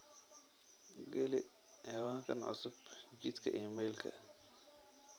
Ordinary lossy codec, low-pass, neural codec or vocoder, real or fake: none; none; vocoder, 44.1 kHz, 128 mel bands every 512 samples, BigVGAN v2; fake